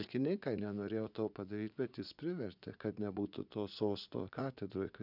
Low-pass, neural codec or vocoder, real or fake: 5.4 kHz; vocoder, 44.1 kHz, 80 mel bands, Vocos; fake